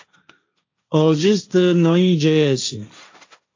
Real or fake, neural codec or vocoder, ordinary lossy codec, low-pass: fake; codec, 16 kHz, 1.1 kbps, Voila-Tokenizer; AAC, 48 kbps; 7.2 kHz